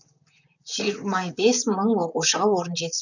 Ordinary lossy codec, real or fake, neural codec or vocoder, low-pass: none; fake; vocoder, 44.1 kHz, 128 mel bands, Pupu-Vocoder; 7.2 kHz